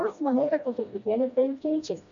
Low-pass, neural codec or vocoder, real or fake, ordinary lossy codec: 7.2 kHz; codec, 16 kHz, 1 kbps, FreqCodec, smaller model; fake; AAC, 64 kbps